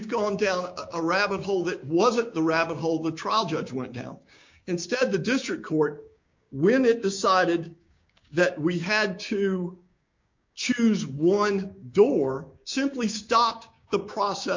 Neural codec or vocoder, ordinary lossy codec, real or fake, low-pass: codec, 16 kHz, 6 kbps, DAC; MP3, 48 kbps; fake; 7.2 kHz